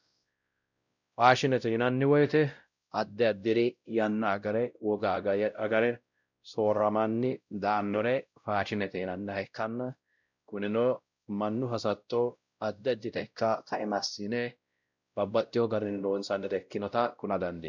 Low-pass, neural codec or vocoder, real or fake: 7.2 kHz; codec, 16 kHz, 0.5 kbps, X-Codec, WavLM features, trained on Multilingual LibriSpeech; fake